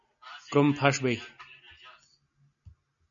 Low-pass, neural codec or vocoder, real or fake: 7.2 kHz; none; real